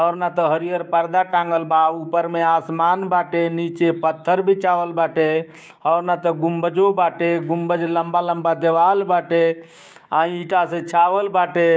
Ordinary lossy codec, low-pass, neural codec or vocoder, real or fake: none; none; codec, 16 kHz, 6 kbps, DAC; fake